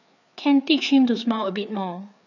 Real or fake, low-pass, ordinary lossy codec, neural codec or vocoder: fake; 7.2 kHz; none; codec, 16 kHz, 4 kbps, FreqCodec, larger model